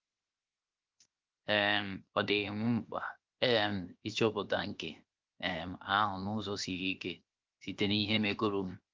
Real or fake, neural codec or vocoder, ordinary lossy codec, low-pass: fake; codec, 16 kHz, 0.7 kbps, FocalCodec; Opus, 24 kbps; 7.2 kHz